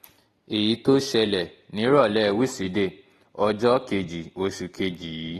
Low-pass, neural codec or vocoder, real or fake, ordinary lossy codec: 19.8 kHz; none; real; AAC, 32 kbps